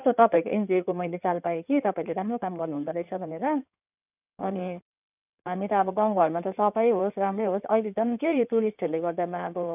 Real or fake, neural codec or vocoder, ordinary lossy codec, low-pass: fake; codec, 16 kHz in and 24 kHz out, 2.2 kbps, FireRedTTS-2 codec; none; 3.6 kHz